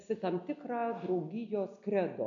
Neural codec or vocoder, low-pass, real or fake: none; 7.2 kHz; real